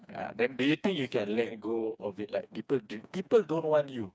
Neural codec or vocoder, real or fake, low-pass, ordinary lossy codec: codec, 16 kHz, 2 kbps, FreqCodec, smaller model; fake; none; none